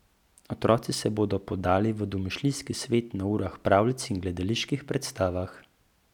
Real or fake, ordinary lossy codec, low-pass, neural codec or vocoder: real; none; 19.8 kHz; none